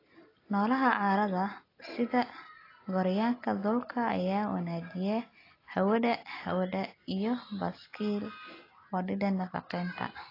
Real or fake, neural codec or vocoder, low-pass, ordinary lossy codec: real; none; 5.4 kHz; AAC, 24 kbps